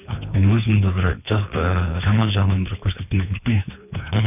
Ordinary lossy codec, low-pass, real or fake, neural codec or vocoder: none; 3.6 kHz; fake; codec, 24 kHz, 3 kbps, HILCodec